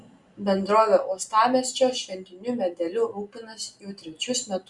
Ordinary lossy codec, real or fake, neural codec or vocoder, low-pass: Opus, 64 kbps; real; none; 10.8 kHz